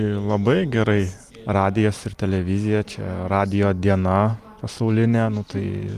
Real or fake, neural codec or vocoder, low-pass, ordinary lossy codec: real; none; 14.4 kHz; Opus, 32 kbps